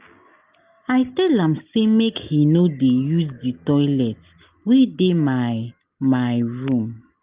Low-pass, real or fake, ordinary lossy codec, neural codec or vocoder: 3.6 kHz; real; Opus, 32 kbps; none